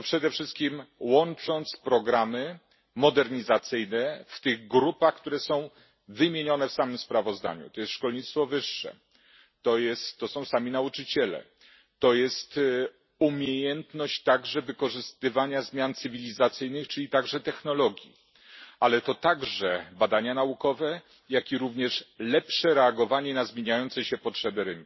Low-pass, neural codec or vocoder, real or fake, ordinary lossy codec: 7.2 kHz; none; real; MP3, 24 kbps